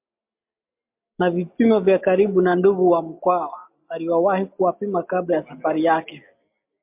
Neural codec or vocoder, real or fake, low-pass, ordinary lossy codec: none; real; 3.6 kHz; MP3, 32 kbps